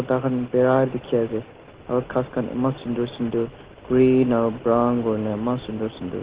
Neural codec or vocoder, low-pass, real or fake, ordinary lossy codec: none; 3.6 kHz; real; Opus, 16 kbps